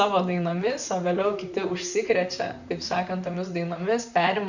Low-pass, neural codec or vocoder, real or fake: 7.2 kHz; vocoder, 44.1 kHz, 128 mel bands, Pupu-Vocoder; fake